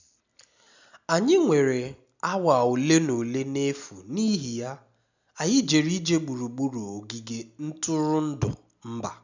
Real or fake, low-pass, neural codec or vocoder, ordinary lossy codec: real; 7.2 kHz; none; none